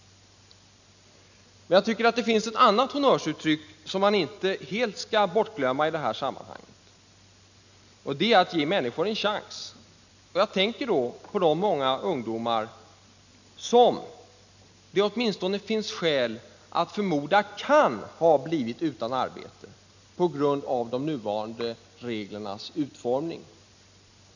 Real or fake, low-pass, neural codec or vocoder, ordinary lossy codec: real; 7.2 kHz; none; none